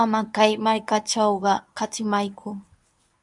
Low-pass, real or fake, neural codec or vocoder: 10.8 kHz; fake; codec, 24 kHz, 0.9 kbps, WavTokenizer, medium speech release version 1